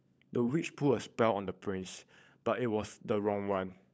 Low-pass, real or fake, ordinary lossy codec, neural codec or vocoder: none; fake; none; codec, 16 kHz, 16 kbps, FunCodec, trained on LibriTTS, 50 frames a second